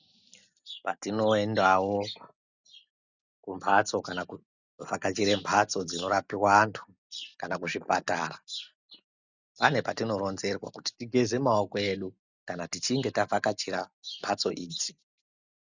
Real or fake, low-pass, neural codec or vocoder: real; 7.2 kHz; none